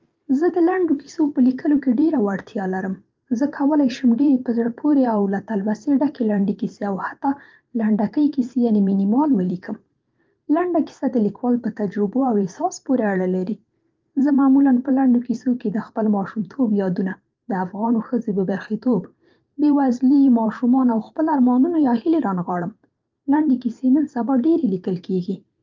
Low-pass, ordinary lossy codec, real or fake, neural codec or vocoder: 7.2 kHz; Opus, 24 kbps; fake; vocoder, 44.1 kHz, 128 mel bands every 512 samples, BigVGAN v2